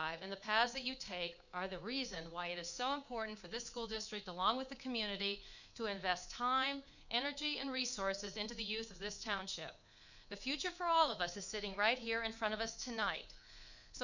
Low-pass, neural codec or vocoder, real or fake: 7.2 kHz; codec, 24 kHz, 3.1 kbps, DualCodec; fake